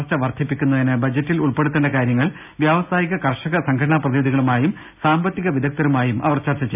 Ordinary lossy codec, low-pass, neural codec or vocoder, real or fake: none; 3.6 kHz; none; real